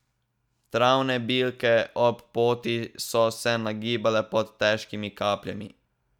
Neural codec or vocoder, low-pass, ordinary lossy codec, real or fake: none; 19.8 kHz; none; real